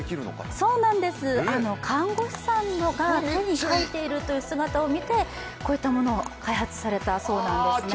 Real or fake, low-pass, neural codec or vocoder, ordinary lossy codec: real; none; none; none